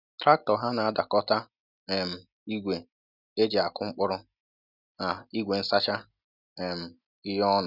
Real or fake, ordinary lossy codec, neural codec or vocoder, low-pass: real; none; none; 5.4 kHz